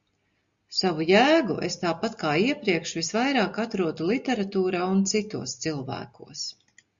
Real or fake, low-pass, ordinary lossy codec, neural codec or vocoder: real; 7.2 kHz; Opus, 64 kbps; none